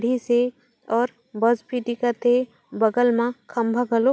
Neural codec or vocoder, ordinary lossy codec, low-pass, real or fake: none; none; none; real